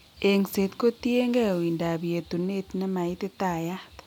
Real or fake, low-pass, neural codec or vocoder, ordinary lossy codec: real; 19.8 kHz; none; none